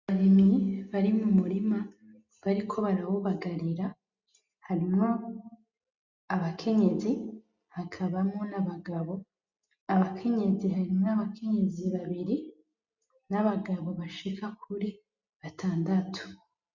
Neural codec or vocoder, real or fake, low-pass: none; real; 7.2 kHz